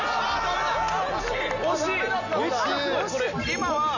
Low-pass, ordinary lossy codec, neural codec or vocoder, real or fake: 7.2 kHz; none; none; real